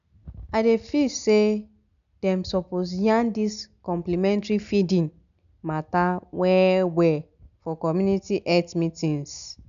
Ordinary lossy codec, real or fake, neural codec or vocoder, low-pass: none; real; none; 7.2 kHz